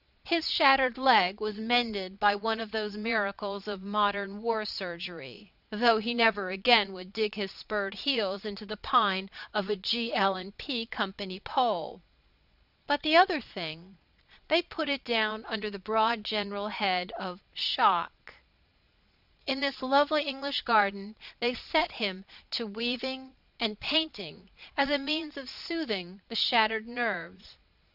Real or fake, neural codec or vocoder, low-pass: fake; vocoder, 22.05 kHz, 80 mel bands, Vocos; 5.4 kHz